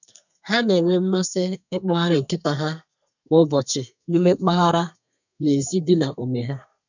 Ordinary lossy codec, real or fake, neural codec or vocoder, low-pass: none; fake; codec, 32 kHz, 1.9 kbps, SNAC; 7.2 kHz